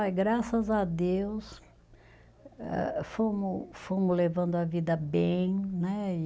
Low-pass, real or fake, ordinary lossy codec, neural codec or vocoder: none; real; none; none